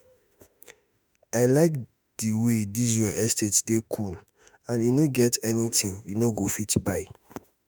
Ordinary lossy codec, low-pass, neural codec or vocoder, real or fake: none; none; autoencoder, 48 kHz, 32 numbers a frame, DAC-VAE, trained on Japanese speech; fake